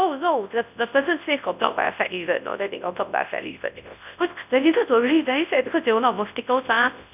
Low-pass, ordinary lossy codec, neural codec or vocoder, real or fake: 3.6 kHz; none; codec, 24 kHz, 0.9 kbps, WavTokenizer, large speech release; fake